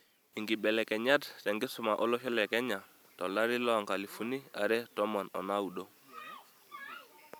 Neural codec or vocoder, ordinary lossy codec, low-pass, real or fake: vocoder, 44.1 kHz, 128 mel bands every 256 samples, BigVGAN v2; none; none; fake